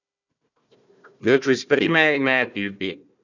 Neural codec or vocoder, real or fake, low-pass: codec, 16 kHz, 1 kbps, FunCodec, trained on Chinese and English, 50 frames a second; fake; 7.2 kHz